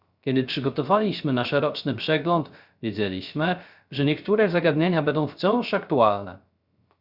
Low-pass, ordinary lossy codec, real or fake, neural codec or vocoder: 5.4 kHz; Opus, 64 kbps; fake; codec, 16 kHz, 0.3 kbps, FocalCodec